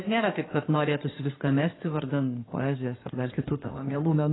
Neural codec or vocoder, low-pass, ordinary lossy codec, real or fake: codec, 16 kHz in and 24 kHz out, 2.2 kbps, FireRedTTS-2 codec; 7.2 kHz; AAC, 16 kbps; fake